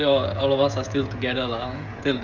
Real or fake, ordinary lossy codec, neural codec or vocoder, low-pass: fake; none; codec, 16 kHz, 16 kbps, FreqCodec, smaller model; 7.2 kHz